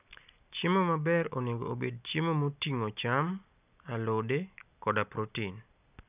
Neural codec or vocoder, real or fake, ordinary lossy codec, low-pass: none; real; none; 3.6 kHz